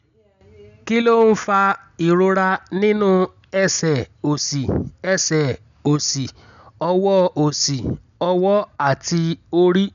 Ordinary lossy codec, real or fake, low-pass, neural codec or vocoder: none; real; 7.2 kHz; none